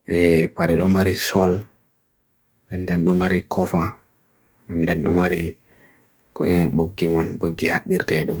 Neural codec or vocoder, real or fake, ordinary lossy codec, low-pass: codec, 44.1 kHz, 2.6 kbps, DAC; fake; none; none